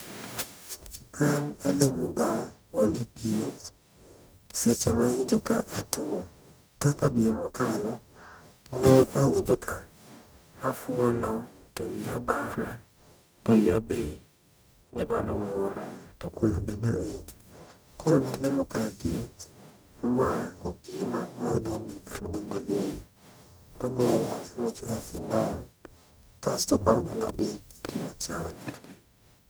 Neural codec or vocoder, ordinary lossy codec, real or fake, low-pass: codec, 44.1 kHz, 0.9 kbps, DAC; none; fake; none